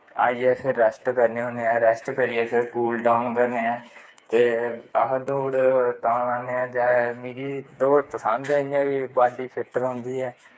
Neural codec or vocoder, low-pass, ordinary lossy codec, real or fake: codec, 16 kHz, 4 kbps, FreqCodec, smaller model; none; none; fake